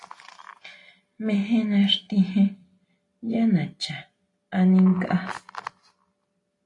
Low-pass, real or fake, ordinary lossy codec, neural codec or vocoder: 10.8 kHz; real; AAC, 48 kbps; none